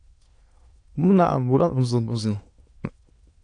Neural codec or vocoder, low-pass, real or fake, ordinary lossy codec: autoencoder, 22.05 kHz, a latent of 192 numbers a frame, VITS, trained on many speakers; 9.9 kHz; fake; AAC, 48 kbps